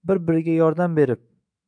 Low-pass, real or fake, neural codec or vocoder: 9.9 kHz; fake; autoencoder, 48 kHz, 128 numbers a frame, DAC-VAE, trained on Japanese speech